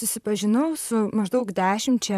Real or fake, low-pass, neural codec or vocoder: fake; 14.4 kHz; vocoder, 44.1 kHz, 128 mel bands, Pupu-Vocoder